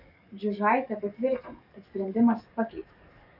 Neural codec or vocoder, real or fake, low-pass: codec, 44.1 kHz, 7.8 kbps, DAC; fake; 5.4 kHz